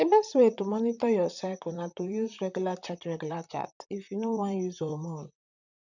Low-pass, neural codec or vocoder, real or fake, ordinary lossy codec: 7.2 kHz; vocoder, 44.1 kHz, 128 mel bands, Pupu-Vocoder; fake; none